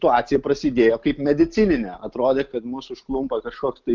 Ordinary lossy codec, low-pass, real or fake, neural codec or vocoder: Opus, 24 kbps; 7.2 kHz; real; none